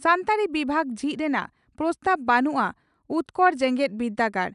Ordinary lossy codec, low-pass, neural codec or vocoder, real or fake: none; 10.8 kHz; none; real